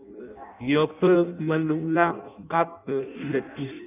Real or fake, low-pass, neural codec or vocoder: fake; 3.6 kHz; codec, 16 kHz in and 24 kHz out, 0.6 kbps, FireRedTTS-2 codec